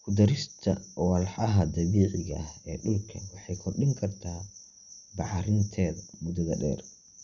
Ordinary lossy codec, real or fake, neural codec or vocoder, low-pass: none; real; none; 7.2 kHz